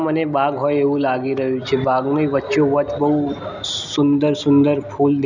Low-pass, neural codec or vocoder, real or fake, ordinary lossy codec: 7.2 kHz; none; real; none